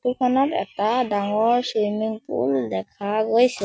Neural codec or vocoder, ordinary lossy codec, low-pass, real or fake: none; none; none; real